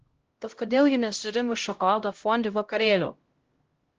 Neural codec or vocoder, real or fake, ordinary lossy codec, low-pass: codec, 16 kHz, 0.5 kbps, X-Codec, HuBERT features, trained on LibriSpeech; fake; Opus, 16 kbps; 7.2 kHz